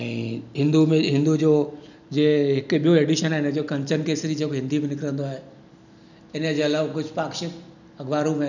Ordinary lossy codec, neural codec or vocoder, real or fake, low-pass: none; none; real; 7.2 kHz